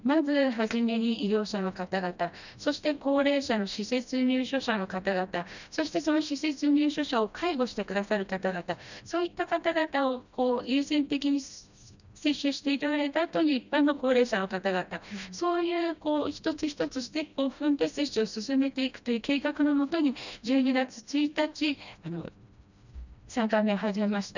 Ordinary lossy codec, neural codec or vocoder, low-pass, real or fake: none; codec, 16 kHz, 1 kbps, FreqCodec, smaller model; 7.2 kHz; fake